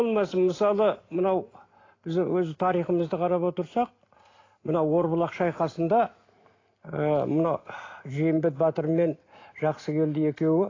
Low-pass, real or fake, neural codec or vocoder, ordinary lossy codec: 7.2 kHz; real; none; AAC, 32 kbps